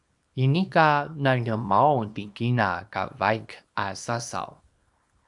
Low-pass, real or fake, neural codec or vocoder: 10.8 kHz; fake; codec, 24 kHz, 0.9 kbps, WavTokenizer, small release